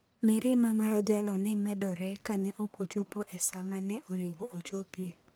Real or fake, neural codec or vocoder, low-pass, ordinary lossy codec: fake; codec, 44.1 kHz, 1.7 kbps, Pupu-Codec; none; none